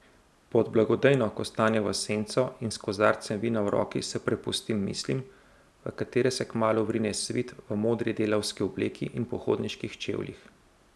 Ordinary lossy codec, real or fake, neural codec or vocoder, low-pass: none; real; none; none